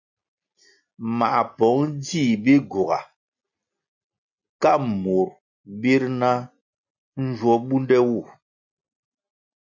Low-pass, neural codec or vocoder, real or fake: 7.2 kHz; none; real